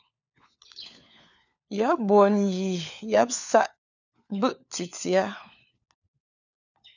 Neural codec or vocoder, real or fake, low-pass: codec, 16 kHz, 4 kbps, FunCodec, trained on LibriTTS, 50 frames a second; fake; 7.2 kHz